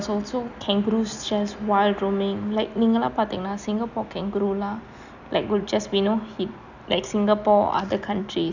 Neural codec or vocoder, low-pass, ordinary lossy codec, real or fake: none; 7.2 kHz; none; real